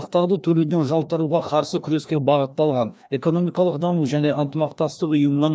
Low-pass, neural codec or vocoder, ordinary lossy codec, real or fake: none; codec, 16 kHz, 1 kbps, FreqCodec, larger model; none; fake